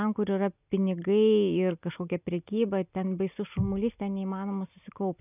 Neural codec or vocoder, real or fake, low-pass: none; real; 3.6 kHz